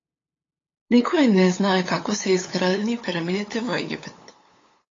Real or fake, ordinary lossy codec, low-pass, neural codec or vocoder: fake; AAC, 32 kbps; 7.2 kHz; codec, 16 kHz, 8 kbps, FunCodec, trained on LibriTTS, 25 frames a second